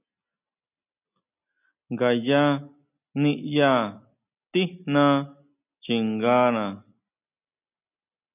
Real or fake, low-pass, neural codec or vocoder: real; 3.6 kHz; none